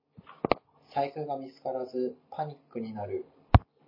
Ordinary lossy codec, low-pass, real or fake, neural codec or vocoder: MP3, 24 kbps; 5.4 kHz; real; none